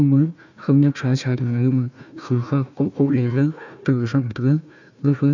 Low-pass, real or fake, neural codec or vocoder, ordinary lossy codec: 7.2 kHz; fake; codec, 16 kHz, 1 kbps, FunCodec, trained on Chinese and English, 50 frames a second; none